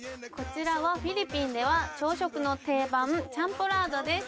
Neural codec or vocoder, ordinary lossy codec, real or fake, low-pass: none; none; real; none